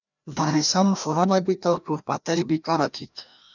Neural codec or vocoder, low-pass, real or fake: codec, 16 kHz, 1 kbps, FreqCodec, larger model; 7.2 kHz; fake